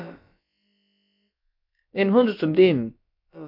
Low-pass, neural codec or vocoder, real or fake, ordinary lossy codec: 5.4 kHz; codec, 16 kHz, about 1 kbps, DyCAST, with the encoder's durations; fake; MP3, 48 kbps